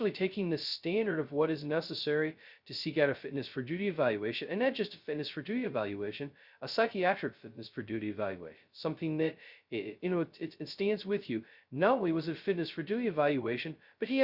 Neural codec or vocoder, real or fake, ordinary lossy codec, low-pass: codec, 16 kHz, 0.2 kbps, FocalCodec; fake; Opus, 64 kbps; 5.4 kHz